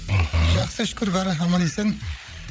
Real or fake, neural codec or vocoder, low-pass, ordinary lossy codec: fake; codec, 16 kHz, 8 kbps, FreqCodec, larger model; none; none